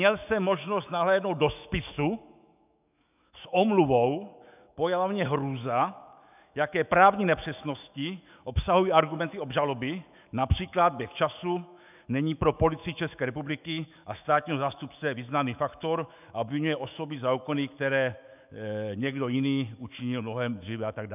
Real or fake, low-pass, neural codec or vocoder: fake; 3.6 kHz; autoencoder, 48 kHz, 128 numbers a frame, DAC-VAE, trained on Japanese speech